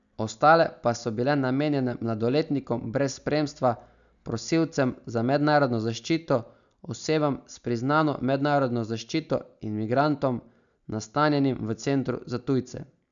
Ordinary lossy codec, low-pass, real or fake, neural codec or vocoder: none; 7.2 kHz; real; none